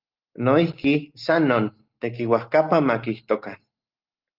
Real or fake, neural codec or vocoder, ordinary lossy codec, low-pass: real; none; Opus, 32 kbps; 5.4 kHz